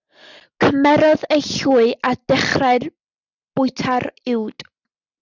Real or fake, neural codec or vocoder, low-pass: real; none; 7.2 kHz